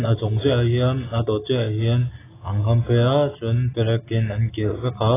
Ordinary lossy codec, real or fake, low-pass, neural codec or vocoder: AAC, 16 kbps; real; 3.6 kHz; none